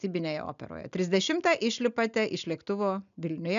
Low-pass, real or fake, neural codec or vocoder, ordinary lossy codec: 7.2 kHz; real; none; MP3, 96 kbps